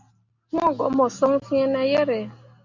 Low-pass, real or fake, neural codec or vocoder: 7.2 kHz; real; none